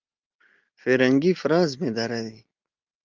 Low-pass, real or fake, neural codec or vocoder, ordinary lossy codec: 7.2 kHz; real; none; Opus, 32 kbps